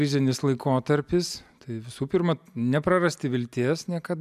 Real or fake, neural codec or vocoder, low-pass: real; none; 14.4 kHz